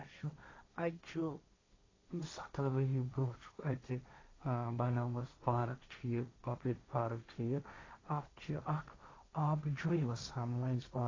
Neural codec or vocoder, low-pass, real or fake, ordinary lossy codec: codec, 16 kHz, 1.1 kbps, Voila-Tokenizer; 7.2 kHz; fake; AAC, 32 kbps